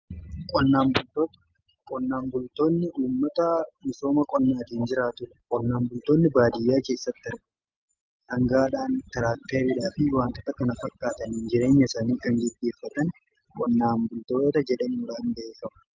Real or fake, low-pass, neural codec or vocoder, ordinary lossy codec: real; 7.2 kHz; none; Opus, 32 kbps